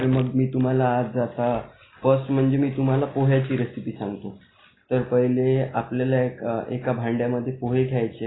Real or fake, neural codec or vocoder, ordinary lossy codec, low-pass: real; none; AAC, 16 kbps; 7.2 kHz